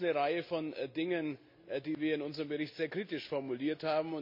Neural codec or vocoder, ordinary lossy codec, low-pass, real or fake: none; MP3, 32 kbps; 5.4 kHz; real